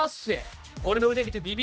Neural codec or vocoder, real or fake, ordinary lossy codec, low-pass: codec, 16 kHz, 1 kbps, X-Codec, HuBERT features, trained on general audio; fake; none; none